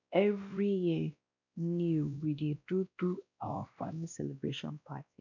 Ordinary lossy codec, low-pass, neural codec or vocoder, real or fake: none; 7.2 kHz; codec, 16 kHz, 1 kbps, X-Codec, WavLM features, trained on Multilingual LibriSpeech; fake